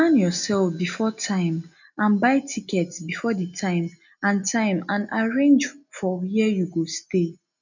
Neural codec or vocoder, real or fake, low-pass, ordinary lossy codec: none; real; 7.2 kHz; none